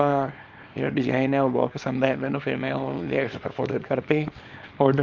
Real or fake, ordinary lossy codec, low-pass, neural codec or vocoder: fake; Opus, 24 kbps; 7.2 kHz; codec, 24 kHz, 0.9 kbps, WavTokenizer, small release